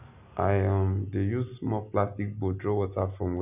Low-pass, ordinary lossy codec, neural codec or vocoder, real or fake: 3.6 kHz; none; none; real